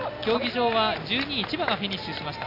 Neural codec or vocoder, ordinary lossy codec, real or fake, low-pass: none; AAC, 32 kbps; real; 5.4 kHz